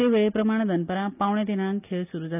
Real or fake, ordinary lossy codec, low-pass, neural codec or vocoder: real; none; 3.6 kHz; none